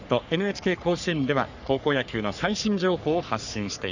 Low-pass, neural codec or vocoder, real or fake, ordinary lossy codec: 7.2 kHz; codec, 44.1 kHz, 3.4 kbps, Pupu-Codec; fake; none